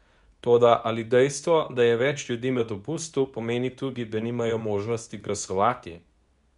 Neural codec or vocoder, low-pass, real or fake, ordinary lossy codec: codec, 24 kHz, 0.9 kbps, WavTokenizer, medium speech release version 2; 10.8 kHz; fake; MP3, 96 kbps